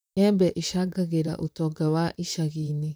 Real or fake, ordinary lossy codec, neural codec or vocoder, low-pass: fake; none; vocoder, 44.1 kHz, 128 mel bands, Pupu-Vocoder; none